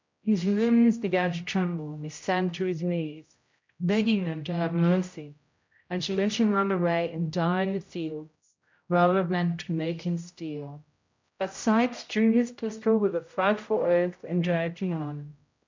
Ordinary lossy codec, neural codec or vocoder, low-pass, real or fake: MP3, 64 kbps; codec, 16 kHz, 0.5 kbps, X-Codec, HuBERT features, trained on general audio; 7.2 kHz; fake